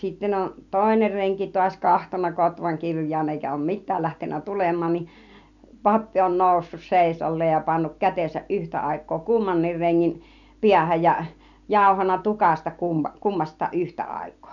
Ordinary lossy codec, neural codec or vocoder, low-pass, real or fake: none; none; 7.2 kHz; real